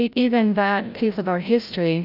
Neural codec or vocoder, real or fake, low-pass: codec, 16 kHz, 0.5 kbps, FreqCodec, larger model; fake; 5.4 kHz